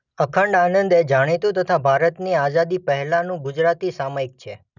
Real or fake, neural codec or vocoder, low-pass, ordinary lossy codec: real; none; 7.2 kHz; none